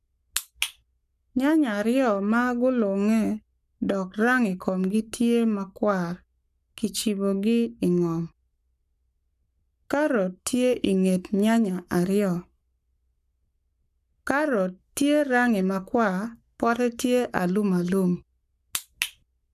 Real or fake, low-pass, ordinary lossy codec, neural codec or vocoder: fake; 14.4 kHz; none; codec, 44.1 kHz, 7.8 kbps, Pupu-Codec